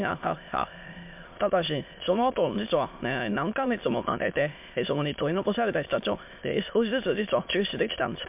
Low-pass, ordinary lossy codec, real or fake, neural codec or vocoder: 3.6 kHz; MP3, 32 kbps; fake; autoencoder, 22.05 kHz, a latent of 192 numbers a frame, VITS, trained on many speakers